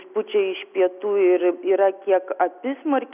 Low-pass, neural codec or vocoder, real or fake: 3.6 kHz; none; real